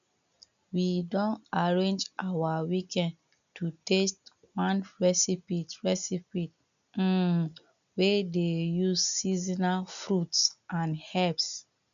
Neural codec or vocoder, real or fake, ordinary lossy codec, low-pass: none; real; none; 7.2 kHz